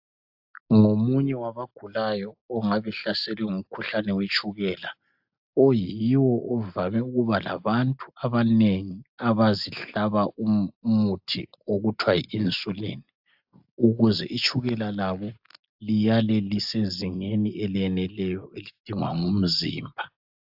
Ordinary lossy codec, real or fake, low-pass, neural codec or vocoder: AAC, 48 kbps; real; 5.4 kHz; none